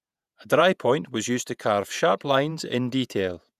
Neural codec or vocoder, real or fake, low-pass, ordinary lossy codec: vocoder, 48 kHz, 128 mel bands, Vocos; fake; 14.4 kHz; none